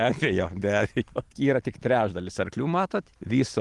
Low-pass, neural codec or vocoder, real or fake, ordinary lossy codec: 10.8 kHz; none; real; Opus, 24 kbps